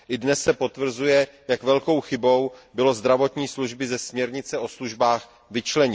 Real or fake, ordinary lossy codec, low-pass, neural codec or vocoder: real; none; none; none